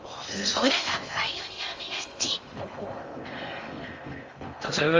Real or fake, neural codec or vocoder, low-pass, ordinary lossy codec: fake; codec, 16 kHz in and 24 kHz out, 0.6 kbps, FocalCodec, streaming, 2048 codes; 7.2 kHz; Opus, 32 kbps